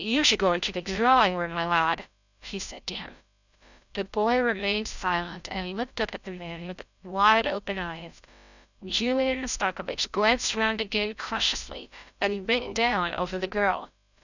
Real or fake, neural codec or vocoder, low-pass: fake; codec, 16 kHz, 0.5 kbps, FreqCodec, larger model; 7.2 kHz